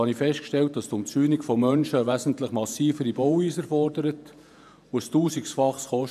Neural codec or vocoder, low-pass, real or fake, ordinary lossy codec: vocoder, 48 kHz, 128 mel bands, Vocos; 14.4 kHz; fake; none